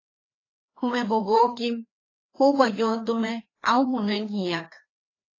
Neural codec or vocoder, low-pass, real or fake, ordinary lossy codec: codec, 16 kHz, 2 kbps, FreqCodec, larger model; 7.2 kHz; fake; AAC, 32 kbps